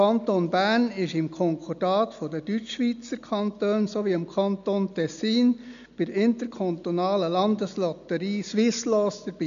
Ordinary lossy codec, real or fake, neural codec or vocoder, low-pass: none; real; none; 7.2 kHz